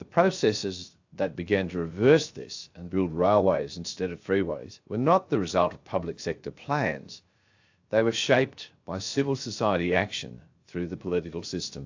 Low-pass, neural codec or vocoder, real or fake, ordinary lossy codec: 7.2 kHz; codec, 16 kHz, 0.7 kbps, FocalCodec; fake; AAC, 48 kbps